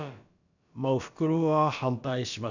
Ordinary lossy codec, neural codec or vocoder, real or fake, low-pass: none; codec, 16 kHz, about 1 kbps, DyCAST, with the encoder's durations; fake; 7.2 kHz